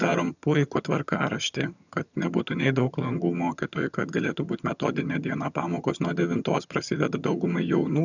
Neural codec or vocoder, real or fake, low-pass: vocoder, 22.05 kHz, 80 mel bands, HiFi-GAN; fake; 7.2 kHz